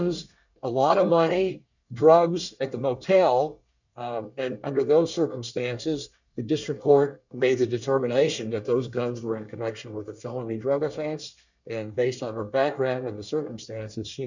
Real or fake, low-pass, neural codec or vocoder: fake; 7.2 kHz; codec, 24 kHz, 1 kbps, SNAC